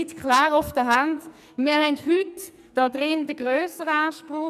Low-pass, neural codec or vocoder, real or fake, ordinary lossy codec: 14.4 kHz; codec, 44.1 kHz, 2.6 kbps, SNAC; fake; none